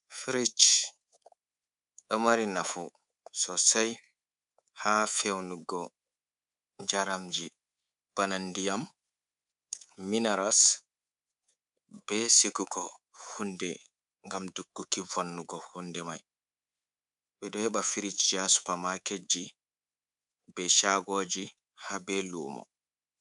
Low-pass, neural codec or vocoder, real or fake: 10.8 kHz; codec, 24 kHz, 3.1 kbps, DualCodec; fake